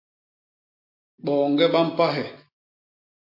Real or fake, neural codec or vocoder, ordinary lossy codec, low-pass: real; none; MP3, 32 kbps; 5.4 kHz